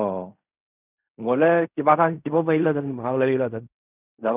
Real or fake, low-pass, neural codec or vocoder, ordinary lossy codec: fake; 3.6 kHz; codec, 16 kHz in and 24 kHz out, 0.4 kbps, LongCat-Audio-Codec, fine tuned four codebook decoder; none